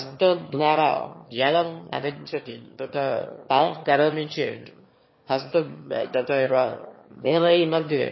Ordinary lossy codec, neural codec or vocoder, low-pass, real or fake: MP3, 24 kbps; autoencoder, 22.05 kHz, a latent of 192 numbers a frame, VITS, trained on one speaker; 7.2 kHz; fake